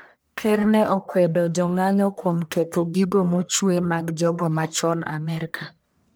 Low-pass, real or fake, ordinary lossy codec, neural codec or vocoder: none; fake; none; codec, 44.1 kHz, 1.7 kbps, Pupu-Codec